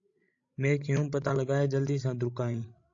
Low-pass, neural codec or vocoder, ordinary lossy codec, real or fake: 7.2 kHz; codec, 16 kHz, 16 kbps, FreqCodec, larger model; AAC, 48 kbps; fake